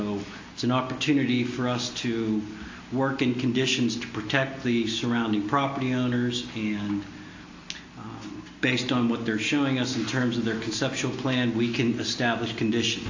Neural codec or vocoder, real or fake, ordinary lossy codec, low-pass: none; real; AAC, 48 kbps; 7.2 kHz